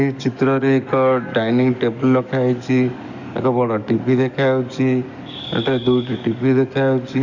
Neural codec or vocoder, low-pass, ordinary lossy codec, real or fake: codec, 44.1 kHz, 7.8 kbps, DAC; 7.2 kHz; none; fake